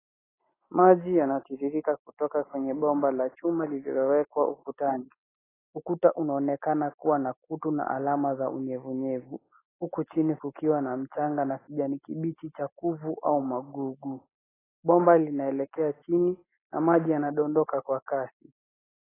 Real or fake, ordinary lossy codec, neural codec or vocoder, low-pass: real; AAC, 16 kbps; none; 3.6 kHz